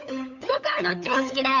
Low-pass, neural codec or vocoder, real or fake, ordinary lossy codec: 7.2 kHz; codec, 16 kHz, 8 kbps, FunCodec, trained on LibriTTS, 25 frames a second; fake; none